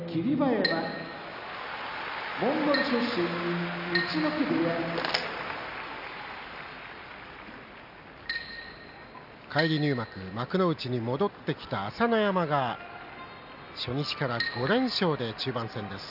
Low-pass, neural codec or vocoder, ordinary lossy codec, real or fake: 5.4 kHz; none; none; real